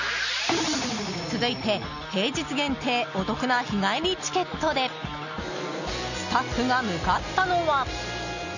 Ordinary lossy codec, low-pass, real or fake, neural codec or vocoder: none; 7.2 kHz; real; none